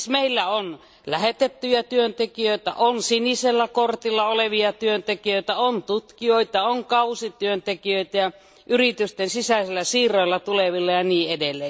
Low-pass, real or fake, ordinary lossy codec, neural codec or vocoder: none; real; none; none